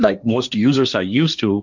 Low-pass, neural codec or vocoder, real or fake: 7.2 kHz; codec, 16 kHz, 1.1 kbps, Voila-Tokenizer; fake